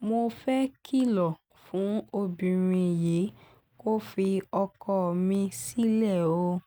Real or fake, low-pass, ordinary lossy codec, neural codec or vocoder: real; none; none; none